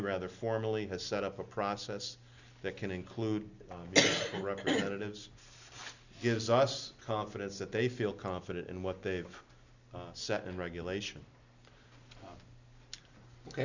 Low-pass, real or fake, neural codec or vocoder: 7.2 kHz; real; none